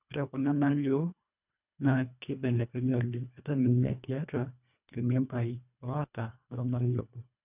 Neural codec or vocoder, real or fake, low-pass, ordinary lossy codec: codec, 24 kHz, 1.5 kbps, HILCodec; fake; 3.6 kHz; none